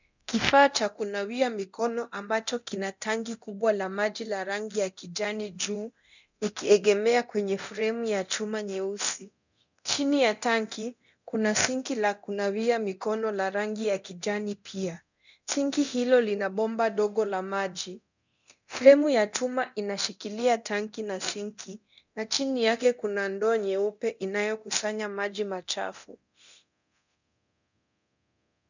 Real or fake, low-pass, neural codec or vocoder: fake; 7.2 kHz; codec, 24 kHz, 0.9 kbps, DualCodec